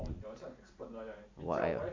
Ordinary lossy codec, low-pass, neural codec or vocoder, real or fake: MP3, 48 kbps; 7.2 kHz; none; real